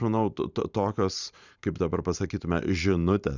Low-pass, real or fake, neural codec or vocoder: 7.2 kHz; fake; vocoder, 44.1 kHz, 128 mel bands every 512 samples, BigVGAN v2